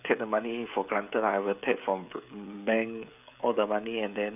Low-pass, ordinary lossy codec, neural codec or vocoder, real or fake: 3.6 kHz; none; codec, 16 kHz, 16 kbps, FreqCodec, smaller model; fake